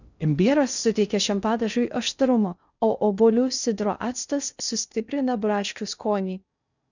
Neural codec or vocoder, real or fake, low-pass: codec, 16 kHz in and 24 kHz out, 0.6 kbps, FocalCodec, streaming, 2048 codes; fake; 7.2 kHz